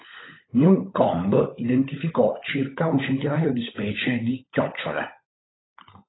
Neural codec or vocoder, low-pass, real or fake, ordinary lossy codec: codec, 16 kHz, 16 kbps, FunCodec, trained on LibriTTS, 50 frames a second; 7.2 kHz; fake; AAC, 16 kbps